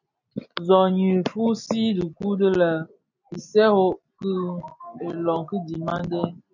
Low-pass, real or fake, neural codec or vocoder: 7.2 kHz; real; none